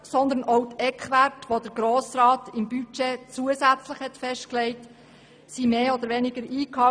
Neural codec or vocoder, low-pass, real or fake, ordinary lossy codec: none; 9.9 kHz; real; none